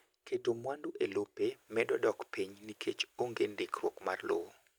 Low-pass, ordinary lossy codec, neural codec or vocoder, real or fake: none; none; none; real